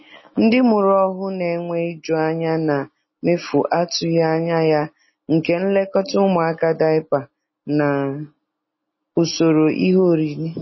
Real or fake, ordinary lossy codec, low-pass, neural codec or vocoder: real; MP3, 24 kbps; 7.2 kHz; none